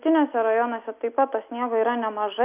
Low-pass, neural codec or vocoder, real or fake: 3.6 kHz; none; real